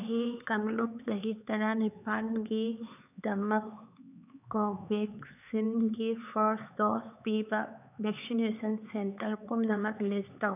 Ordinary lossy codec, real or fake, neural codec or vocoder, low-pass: none; fake; codec, 16 kHz, 4 kbps, X-Codec, HuBERT features, trained on LibriSpeech; 3.6 kHz